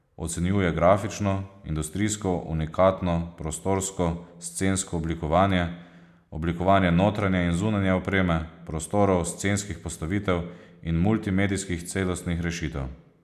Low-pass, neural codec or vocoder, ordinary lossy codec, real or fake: 14.4 kHz; none; none; real